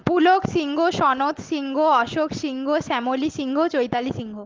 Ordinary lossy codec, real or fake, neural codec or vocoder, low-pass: Opus, 16 kbps; real; none; 7.2 kHz